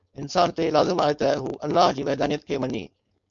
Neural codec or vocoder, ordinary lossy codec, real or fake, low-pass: codec, 16 kHz, 4.8 kbps, FACodec; MP3, 64 kbps; fake; 7.2 kHz